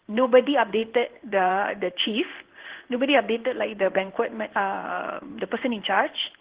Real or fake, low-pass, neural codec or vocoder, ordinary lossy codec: fake; 3.6 kHz; codec, 16 kHz in and 24 kHz out, 1 kbps, XY-Tokenizer; Opus, 16 kbps